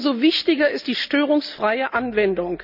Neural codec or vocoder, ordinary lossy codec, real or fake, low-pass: none; none; real; 5.4 kHz